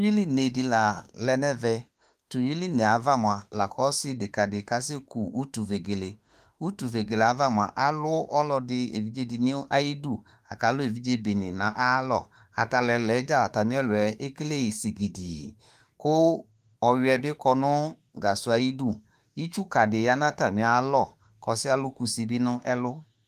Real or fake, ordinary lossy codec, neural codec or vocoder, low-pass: fake; Opus, 32 kbps; autoencoder, 48 kHz, 32 numbers a frame, DAC-VAE, trained on Japanese speech; 14.4 kHz